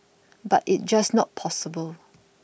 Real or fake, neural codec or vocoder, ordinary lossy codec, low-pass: real; none; none; none